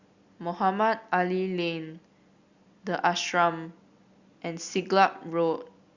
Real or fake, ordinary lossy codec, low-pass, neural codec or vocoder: real; Opus, 64 kbps; 7.2 kHz; none